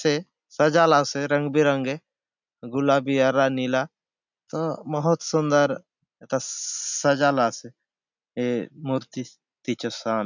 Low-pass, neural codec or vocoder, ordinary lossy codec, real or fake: 7.2 kHz; none; none; real